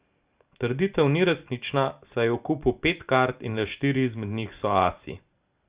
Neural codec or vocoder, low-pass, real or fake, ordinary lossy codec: none; 3.6 kHz; real; Opus, 64 kbps